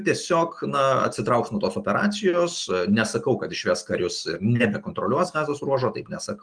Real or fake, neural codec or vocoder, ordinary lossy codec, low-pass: real; none; Opus, 24 kbps; 9.9 kHz